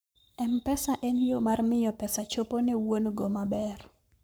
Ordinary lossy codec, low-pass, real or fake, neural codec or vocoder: none; none; fake; codec, 44.1 kHz, 7.8 kbps, Pupu-Codec